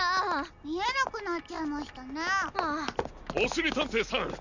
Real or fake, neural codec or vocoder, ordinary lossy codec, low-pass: real; none; none; 7.2 kHz